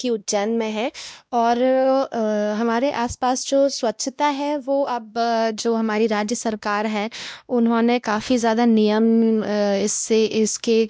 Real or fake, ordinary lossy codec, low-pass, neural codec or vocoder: fake; none; none; codec, 16 kHz, 1 kbps, X-Codec, WavLM features, trained on Multilingual LibriSpeech